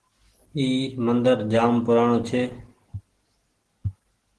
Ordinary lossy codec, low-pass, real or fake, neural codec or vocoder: Opus, 16 kbps; 10.8 kHz; real; none